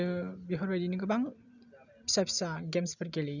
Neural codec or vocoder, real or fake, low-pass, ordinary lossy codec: none; real; 7.2 kHz; none